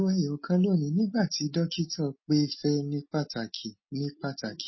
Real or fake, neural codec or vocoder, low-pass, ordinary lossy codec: real; none; 7.2 kHz; MP3, 24 kbps